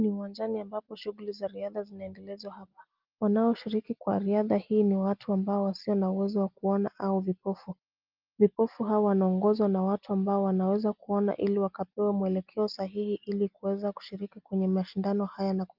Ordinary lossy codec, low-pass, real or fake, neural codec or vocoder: Opus, 24 kbps; 5.4 kHz; real; none